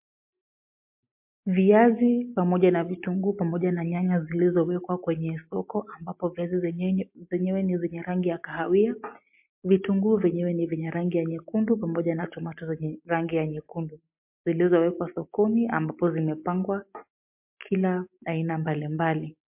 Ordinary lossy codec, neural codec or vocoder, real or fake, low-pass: MP3, 32 kbps; none; real; 3.6 kHz